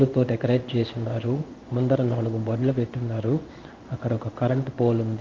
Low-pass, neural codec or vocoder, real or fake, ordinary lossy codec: 7.2 kHz; codec, 16 kHz in and 24 kHz out, 1 kbps, XY-Tokenizer; fake; Opus, 32 kbps